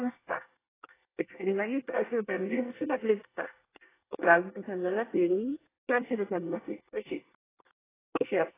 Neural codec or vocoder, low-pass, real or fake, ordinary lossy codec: codec, 24 kHz, 1 kbps, SNAC; 3.6 kHz; fake; AAC, 16 kbps